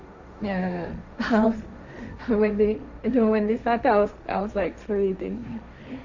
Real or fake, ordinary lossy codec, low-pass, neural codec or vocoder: fake; none; 7.2 kHz; codec, 16 kHz, 1.1 kbps, Voila-Tokenizer